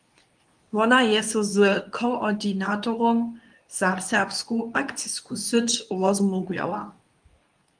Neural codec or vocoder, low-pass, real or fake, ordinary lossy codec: codec, 24 kHz, 0.9 kbps, WavTokenizer, medium speech release version 2; 9.9 kHz; fake; Opus, 32 kbps